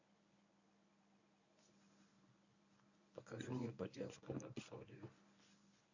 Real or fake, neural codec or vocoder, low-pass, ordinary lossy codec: fake; codec, 24 kHz, 0.9 kbps, WavTokenizer, medium speech release version 1; 7.2 kHz; none